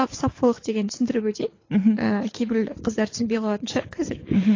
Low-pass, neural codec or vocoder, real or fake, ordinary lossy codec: 7.2 kHz; codec, 24 kHz, 6 kbps, HILCodec; fake; AAC, 32 kbps